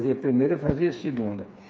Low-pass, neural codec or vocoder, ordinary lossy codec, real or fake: none; codec, 16 kHz, 4 kbps, FreqCodec, smaller model; none; fake